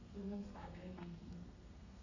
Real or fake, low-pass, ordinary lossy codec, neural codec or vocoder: fake; 7.2 kHz; none; codec, 32 kHz, 1.9 kbps, SNAC